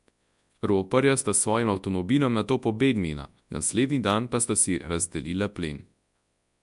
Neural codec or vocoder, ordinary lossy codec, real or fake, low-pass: codec, 24 kHz, 0.9 kbps, WavTokenizer, large speech release; AAC, 96 kbps; fake; 10.8 kHz